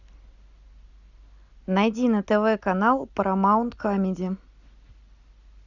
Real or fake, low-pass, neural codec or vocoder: real; 7.2 kHz; none